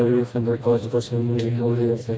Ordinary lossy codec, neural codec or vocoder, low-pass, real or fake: none; codec, 16 kHz, 1 kbps, FreqCodec, smaller model; none; fake